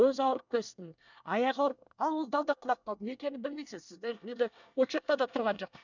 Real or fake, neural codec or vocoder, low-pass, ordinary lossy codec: fake; codec, 24 kHz, 1 kbps, SNAC; 7.2 kHz; none